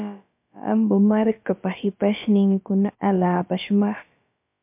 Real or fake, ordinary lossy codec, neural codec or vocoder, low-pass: fake; MP3, 32 kbps; codec, 16 kHz, about 1 kbps, DyCAST, with the encoder's durations; 3.6 kHz